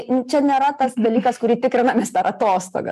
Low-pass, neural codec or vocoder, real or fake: 14.4 kHz; none; real